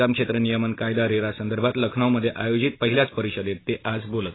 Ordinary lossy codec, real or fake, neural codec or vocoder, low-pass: AAC, 16 kbps; real; none; 7.2 kHz